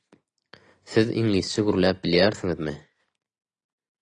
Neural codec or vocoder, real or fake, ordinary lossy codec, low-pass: none; real; AAC, 32 kbps; 9.9 kHz